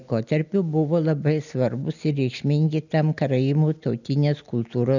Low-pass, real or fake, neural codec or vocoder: 7.2 kHz; real; none